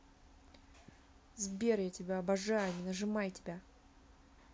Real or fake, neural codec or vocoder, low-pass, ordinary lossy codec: real; none; none; none